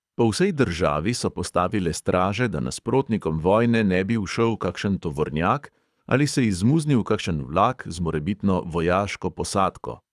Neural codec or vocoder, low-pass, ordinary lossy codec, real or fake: codec, 24 kHz, 6 kbps, HILCodec; none; none; fake